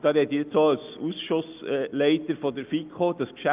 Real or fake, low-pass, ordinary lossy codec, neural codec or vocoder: real; 3.6 kHz; Opus, 24 kbps; none